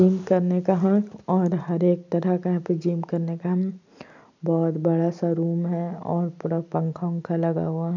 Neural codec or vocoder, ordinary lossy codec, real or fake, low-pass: none; none; real; 7.2 kHz